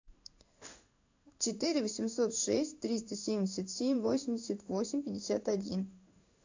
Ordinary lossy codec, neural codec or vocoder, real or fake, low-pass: AAC, 48 kbps; codec, 16 kHz in and 24 kHz out, 1 kbps, XY-Tokenizer; fake; 7.2 kHz